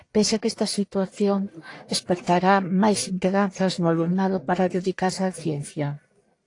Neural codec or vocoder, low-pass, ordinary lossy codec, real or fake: codec, 44.1 kHz, 1.7 kbps, Pupu-Codec; 10.8 kHz; AAC, 48 kbps; fake